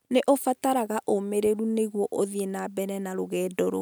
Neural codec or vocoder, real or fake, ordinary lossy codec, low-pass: none; real; none; none